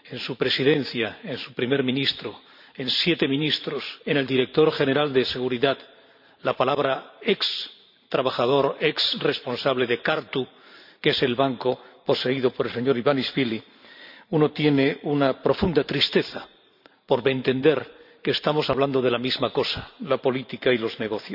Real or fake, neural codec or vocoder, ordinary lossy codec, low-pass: fake; vocoder, 44.1 kHz, 128 mel bands every 512 samples, BigVGAN v2; none; 5.4 kHz